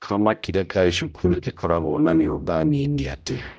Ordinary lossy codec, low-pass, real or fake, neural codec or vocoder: none; none; fake; codec, 16 kHz, 0.5 kbps, X-Codec, HuBERT features, trained on general audio